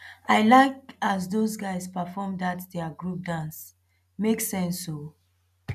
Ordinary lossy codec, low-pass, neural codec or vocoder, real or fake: none; 14.4 kHz; none; real